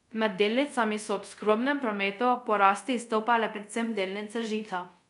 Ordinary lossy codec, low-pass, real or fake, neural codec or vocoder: none; 10.8 kHz; fake; codec, 24 kHz, 0.5 kbps, DualCodec